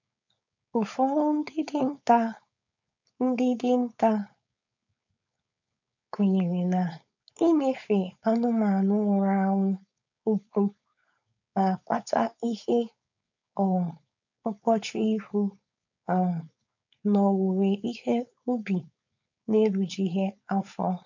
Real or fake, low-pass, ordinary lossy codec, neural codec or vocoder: fake; 7.2 kHz; none; codec, 16 kHz, 4.8 kbps, FACodec